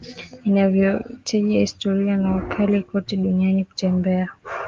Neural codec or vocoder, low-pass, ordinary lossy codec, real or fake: none; 7.2 kHz; Opus, 32 kbps; real